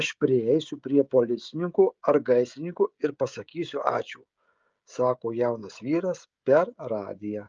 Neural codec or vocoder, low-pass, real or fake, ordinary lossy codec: codec, 16 kHz, 16 kbps, FreqCodec, smaller model; 7.2 kHz; fake; Opus, 24 kbps